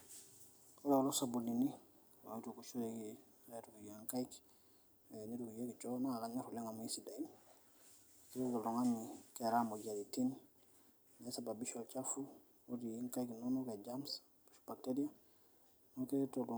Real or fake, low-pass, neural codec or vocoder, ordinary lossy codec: real; none; none; none